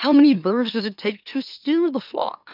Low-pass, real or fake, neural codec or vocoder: 5.4 kHz; fake; autoencoder, 44.1 kHz, a latent of 192 numbers a frame, MeloTTS